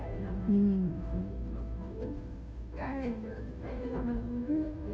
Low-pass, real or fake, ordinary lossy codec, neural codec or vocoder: none; fake; none; codec, 16 kHz, 0.5 kbps, FunCodec, trained on Chinese and English, 25 frames a second